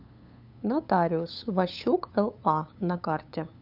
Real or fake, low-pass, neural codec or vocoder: fake; 5.4 kHz; codec, 16 kHz, 8 kbps, FunCodec, trained on LibriTTS, 25 frames a second